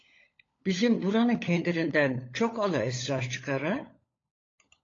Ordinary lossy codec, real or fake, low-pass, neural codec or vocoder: AAC, 32 kbps; fake; 7.2 kHz; codec, 16 kHz, 16 kbps, FunCodec, trained on LibriTTS, 50 frames a second